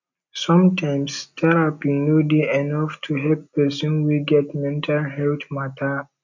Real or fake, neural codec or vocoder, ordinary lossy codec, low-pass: real; none; none; 7.2 kHz